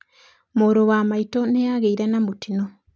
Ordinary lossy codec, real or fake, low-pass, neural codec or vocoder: none; real; none; none